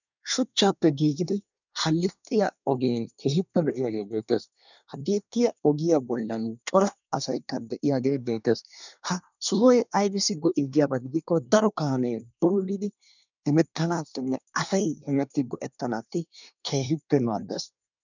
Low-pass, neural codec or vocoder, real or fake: 7.2 kHz; codec, 24 kHz, 1 kbps, SNAC; fake